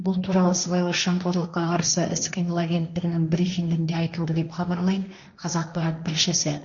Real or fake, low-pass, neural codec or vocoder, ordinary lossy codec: fake; 7.2 kHz; codec, 16 kHz, 1.1 kbps, Voila-Tokenizer; none